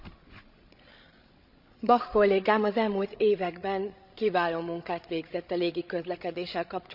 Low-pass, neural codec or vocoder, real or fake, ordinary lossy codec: 5.4 kHz; codec, 16 kHz, 16 kbps, FreqCodec, larger model; fake; none